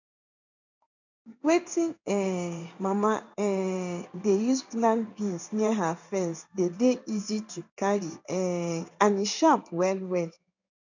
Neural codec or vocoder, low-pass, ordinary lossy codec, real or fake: codec, 16 kHz in and 24 kHz out, 1 kbps, XY-Tokenizer; 7.2 kHz; none; fake